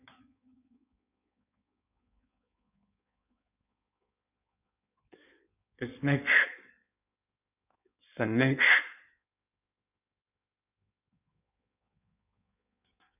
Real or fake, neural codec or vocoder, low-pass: fake; codec, 16 kHz in and 24 kHz out, 1.1 kbps, FireRedTTS-2 codec; 3.6 kHz